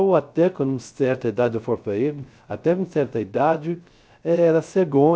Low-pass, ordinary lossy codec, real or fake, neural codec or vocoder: none; none; fake; codec, 16 kHz, 0.3 kbps, FocalCodec